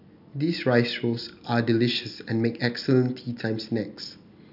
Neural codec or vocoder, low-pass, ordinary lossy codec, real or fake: none; 5.4 kHz; none; real